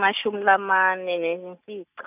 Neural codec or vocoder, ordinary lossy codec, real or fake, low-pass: none; none; real; 3.6 kHz